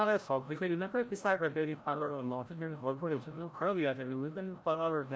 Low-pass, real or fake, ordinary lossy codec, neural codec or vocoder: none; fake; none; codec, 16 kHz, 0.5 kbps, FreqCodec, larger model